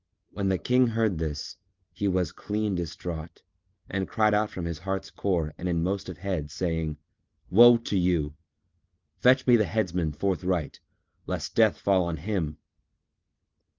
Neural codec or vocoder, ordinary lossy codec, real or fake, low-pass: none; Opus, 32 kbps; real; 7.2 kHz